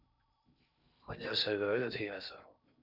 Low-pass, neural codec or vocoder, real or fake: 5.4 kHz; codec, 16 kHz in and 24 kHz out, 0.6 kbps, FocalCodec, streaming, 2048 codes; fake